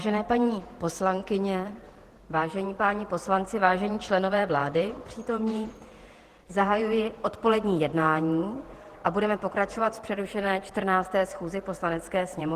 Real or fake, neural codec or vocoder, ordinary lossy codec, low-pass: fake; vocoder, 48 kHz, 128 mel bands, Vocos; Opus, 16 kbps; 14.4 kHz